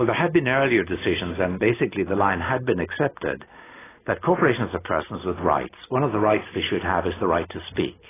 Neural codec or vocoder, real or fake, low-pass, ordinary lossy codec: none; real; 3.6 kHz; AAC, 16 kbps